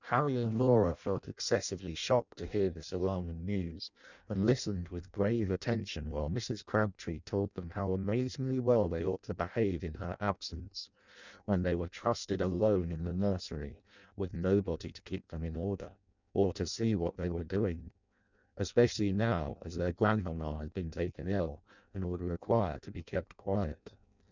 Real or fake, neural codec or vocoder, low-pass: fake; codec, 16 kHz in and 24 kHz out, 0.6 kbps, FireRedTTS-2 codec; 7.2 kHz